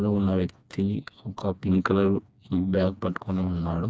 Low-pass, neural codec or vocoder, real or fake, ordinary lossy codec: none; codec, 16 kHz, 2 kbps, FreqCodec, smaller model; fake; none